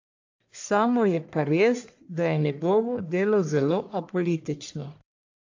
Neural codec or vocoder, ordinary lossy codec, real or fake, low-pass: codec, 44.1 kHz, 1.7 kbps, Pupu-Codec; AAC, 48 kbps; fake; 7.2 kHz